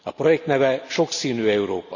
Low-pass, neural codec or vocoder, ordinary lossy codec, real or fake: 7.2 kHz; none; none; real